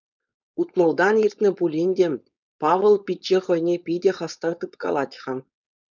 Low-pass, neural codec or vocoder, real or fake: 7.2 kHz; codec, 16 kHz, 4.8 kbps, FACodec; fake